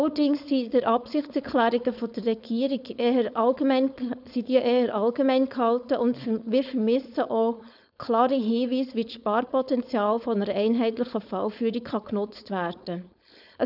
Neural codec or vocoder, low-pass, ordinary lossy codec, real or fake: codec, 16 kHz, 4.8 kbps, FACodec; 5.4 kHz; none; fake